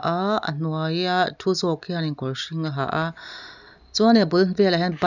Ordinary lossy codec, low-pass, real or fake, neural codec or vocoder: none; 7.2 kHz; real; none